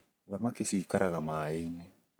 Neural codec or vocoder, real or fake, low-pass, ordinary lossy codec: codec, 44.1 kHz, 3.4 kbps, Pupu-Codec; fake; none; none